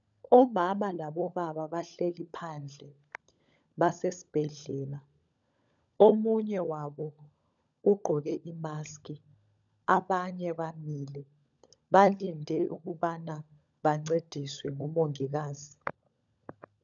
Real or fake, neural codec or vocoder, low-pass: fake; codec, 16 kHz, 16 kbps, FunCodec, trained on LibriTTS, 50 frames a second; 7.2 kHz